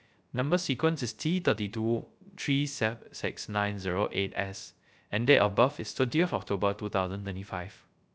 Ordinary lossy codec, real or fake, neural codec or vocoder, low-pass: none; fake; codec, 16 kHz, 0.3 kbps, FocalCodec; none